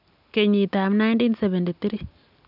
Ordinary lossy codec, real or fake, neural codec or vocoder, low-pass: none; real; none; 5.4 kHz